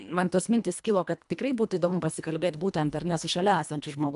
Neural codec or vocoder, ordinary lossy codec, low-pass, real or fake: codec, 24 kHz, 1.5 kbps, HILCodec; AAC, 96 kbps; 10.8 kHz; fake